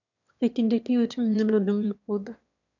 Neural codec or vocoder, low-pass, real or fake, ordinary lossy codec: autoencoder, 22.05 kHz, a latent of 192 numbers a frame, VITS, trained on one speaker; 7.2 kHz; fake; none